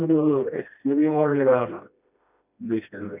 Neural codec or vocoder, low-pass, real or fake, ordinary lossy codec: codec, 16 kHz, 1 kbps, FreqCodec, smaller model; 3.6 kHz; fake; none